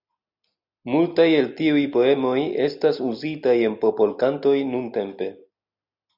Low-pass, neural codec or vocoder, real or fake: 5.4 kHz; none; real